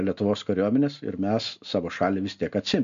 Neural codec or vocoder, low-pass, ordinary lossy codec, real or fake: none; 7.2 kHz; MP3, 64 kbps; real